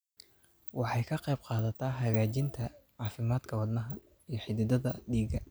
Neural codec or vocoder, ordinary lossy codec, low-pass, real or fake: none; none; none; real